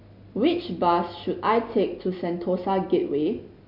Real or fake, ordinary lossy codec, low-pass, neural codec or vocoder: real; none; 5.4 kHz; none